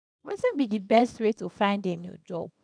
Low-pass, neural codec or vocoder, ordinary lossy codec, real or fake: 9.9 kHz; codec, 24 kHz, 0.9 kbps, WavTokenizer, small release; none; fake